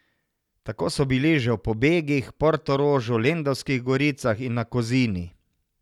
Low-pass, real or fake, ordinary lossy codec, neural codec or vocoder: 19.8 kHz; real; none; none